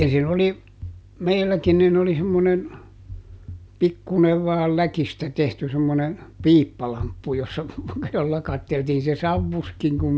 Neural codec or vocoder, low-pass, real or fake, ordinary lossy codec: none; none; real; none